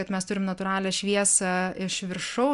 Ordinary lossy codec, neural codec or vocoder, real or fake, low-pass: AAC, 96 kbps; none; real; 10.8 kHz